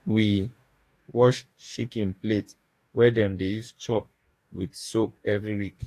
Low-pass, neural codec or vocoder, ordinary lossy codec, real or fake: 14.4 kHz; codec, 44.1 kHz, 2.6 kbps, DAC; AAC, 64 kbps; fake